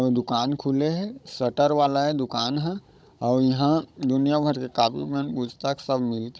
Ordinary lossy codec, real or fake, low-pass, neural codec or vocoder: none; fake; none; codec, 16 kHz, 16 kbps, FunCodec, trained on Chinese and English, 50 frames a second